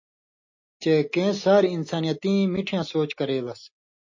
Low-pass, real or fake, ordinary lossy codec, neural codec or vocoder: 7.2 kHz; real; MP3, 32 kbps; none